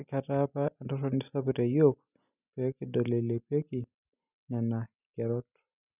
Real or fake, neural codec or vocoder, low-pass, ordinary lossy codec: real; none; 3.6 kHz; none